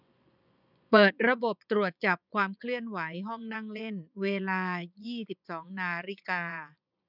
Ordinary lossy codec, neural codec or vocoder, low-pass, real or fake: none; vocoder, 24 kHz, 100 mel bands, Vocos; 5.4 kHz; fake